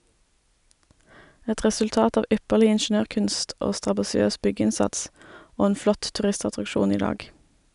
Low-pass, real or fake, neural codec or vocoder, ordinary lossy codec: 10.8 kHz; real; none; none